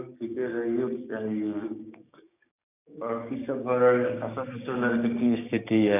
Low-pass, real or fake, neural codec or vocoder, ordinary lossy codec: 3.6 kHz; fake; codec, 16 kHz, 6 kbps, DAC; none